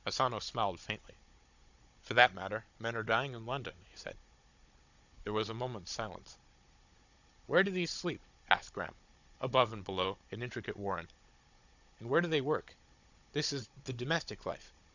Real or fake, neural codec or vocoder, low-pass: fake; codec, 16 kHz, 16 kbps, FunCodec, trained on Chinese and English, 50 frames a second; 7.2 kHz